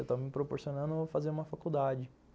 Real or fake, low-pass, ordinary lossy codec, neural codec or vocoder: real; none; none; none